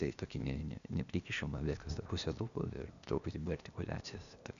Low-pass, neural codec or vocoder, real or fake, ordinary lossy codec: 7.2 kHz; codec, 16 kHz, 0.8 kbps, ZipCodec; fake; MP3, 96 kbps